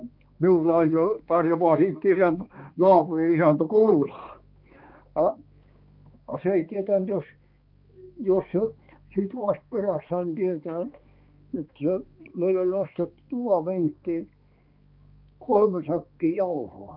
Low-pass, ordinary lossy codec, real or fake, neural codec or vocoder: 5.4 kHz; Opus, 24 kbps; fake; codec, 16 kHz, 2 kbps, X-Codec, HuBERT features, trained on balanced general audio